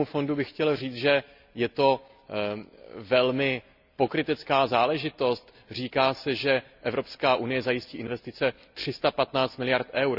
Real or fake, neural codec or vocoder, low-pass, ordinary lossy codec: real; none; 5.4 kHz; none